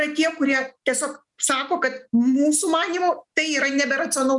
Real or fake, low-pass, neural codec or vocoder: real; 10.8 kHz; none